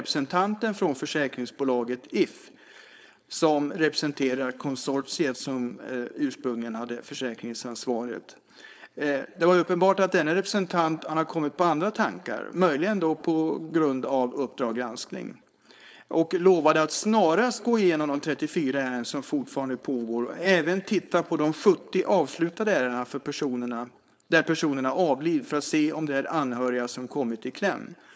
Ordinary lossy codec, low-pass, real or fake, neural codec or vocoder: none; none; fake; codec, 16 kHz, 4.8 kbps, FACodec